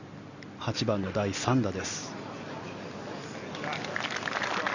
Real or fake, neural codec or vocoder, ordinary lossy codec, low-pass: real; none; none; 7.2 kHz